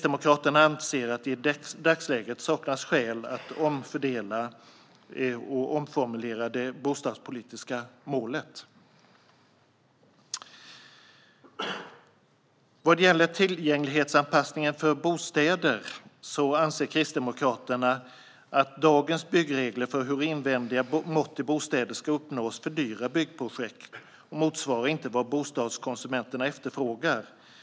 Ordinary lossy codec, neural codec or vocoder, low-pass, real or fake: none; none; none; real